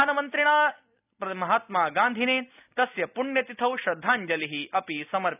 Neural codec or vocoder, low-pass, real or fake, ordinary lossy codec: none; 3.6 kHz; real; none